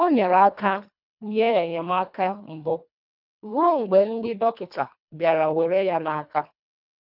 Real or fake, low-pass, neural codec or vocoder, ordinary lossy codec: fake; 5.4 kHz; codec, 24 kHz, 1.5 kbps, HILCodec; none